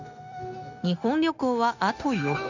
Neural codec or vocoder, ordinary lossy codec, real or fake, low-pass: codec, 16 kHz, 0.9 kbps, LongCat-Audio-Codec; none; fake; 7.2 kHz